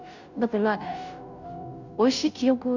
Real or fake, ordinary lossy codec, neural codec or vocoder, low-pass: fake; none; codec, 16 kHz, 0.5 kbps, FunCodec, trained on Chinese and English, 25 frames a second; 7.2 kHz